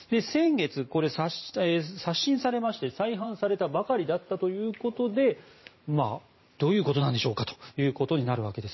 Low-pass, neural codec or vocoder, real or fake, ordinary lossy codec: 7.2 kHz; vocoder, 44.1 kHz, 128 mel bands every 512 samples, BigVGAN v2; fake; MP3, 24 kbps